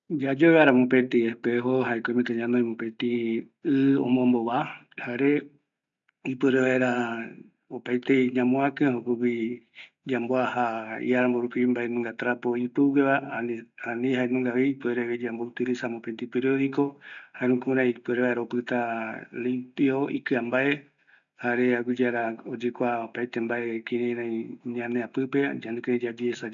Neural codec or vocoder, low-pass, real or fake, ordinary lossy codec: none; 7.2 kHz; real; none